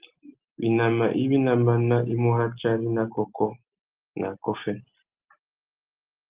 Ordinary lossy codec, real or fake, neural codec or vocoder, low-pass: Opus, 32 kbps; real; none; 3.6 kHz